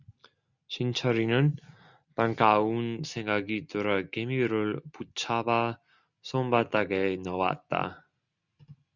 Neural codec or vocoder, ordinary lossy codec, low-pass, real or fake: none; Opus, 64 kbps; 7.2 kHz; real